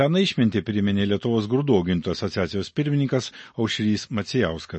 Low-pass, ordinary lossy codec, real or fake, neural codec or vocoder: 10.8 kHz; MP3, 32 kbps; real; none